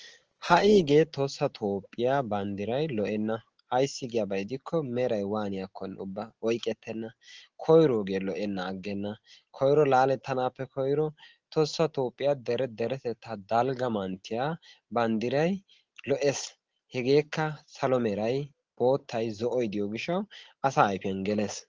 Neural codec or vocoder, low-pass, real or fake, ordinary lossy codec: none; 7.2 kHz; real; Opus, 16 kbps